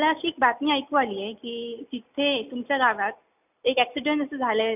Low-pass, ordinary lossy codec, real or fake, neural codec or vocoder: 3.6 kHz; none; real; none